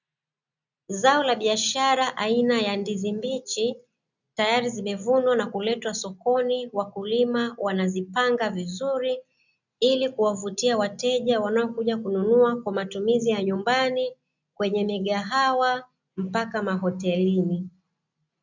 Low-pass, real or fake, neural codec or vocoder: 7.2 kHz; real; none